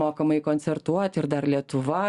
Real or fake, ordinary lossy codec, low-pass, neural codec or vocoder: real; Opus, 64 kbps; 10.8 kHz; none